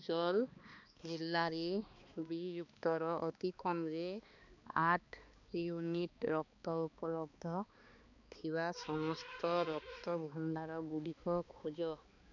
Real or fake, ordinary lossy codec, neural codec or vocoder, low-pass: fake; MP3, 64 kbps; codec, 16 kHz, 2 kbps, X-Codec, HuBERT features, trained on balanced general audio; 7.2 kHz